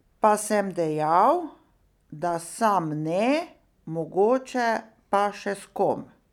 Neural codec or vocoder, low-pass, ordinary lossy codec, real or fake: none; 19.8 kHz; none; real